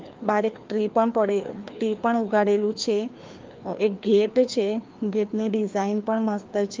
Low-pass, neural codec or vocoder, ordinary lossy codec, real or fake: 7.2 kHz; codec, 16 kHz, 2 kbps, FreqCodec, larger model; Opus, 24 kbps; fake